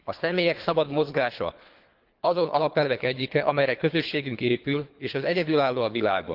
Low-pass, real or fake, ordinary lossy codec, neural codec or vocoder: 5.4 kHz; fake; Opus, 32 kbps; codec, 24 kHz, 3 kbps, HILCodec